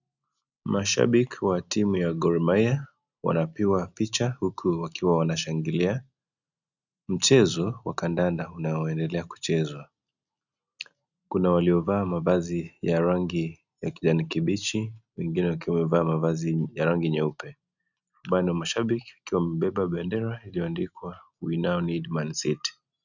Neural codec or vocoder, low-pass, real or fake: none; 7.2 kHz; real